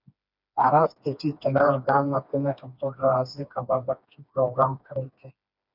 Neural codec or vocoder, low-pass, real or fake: codec, 16 kHz, 2 kbps, FreqCodec, smaller model; 5.4 kHz; fake